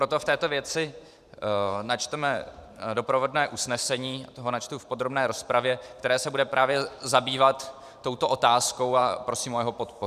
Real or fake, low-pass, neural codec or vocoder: fake; 14.4 kHz; vocoder, 44.1 kHz, 128 mel bands every 512 samples, BigVGAN v2